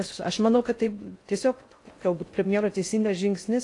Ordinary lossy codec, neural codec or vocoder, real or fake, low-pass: AAC, 48 kbps; codec, 16 kHz in and 24 kHz out, 0.6 kbps, FocalCodec, streaming, 4096 codes; fake; 10.8 kHz